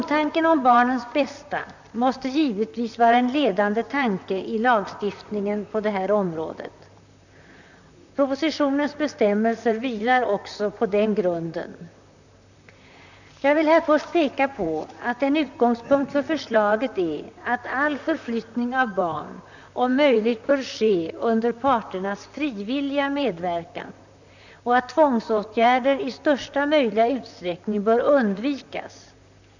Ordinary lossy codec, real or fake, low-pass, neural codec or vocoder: none; fake; 7.2 kHz; vocoder, 44.1 kHz, 128 mel bands, Pupu-Vocoder